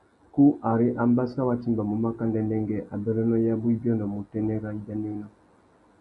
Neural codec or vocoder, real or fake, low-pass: none; real; 10.8 kHz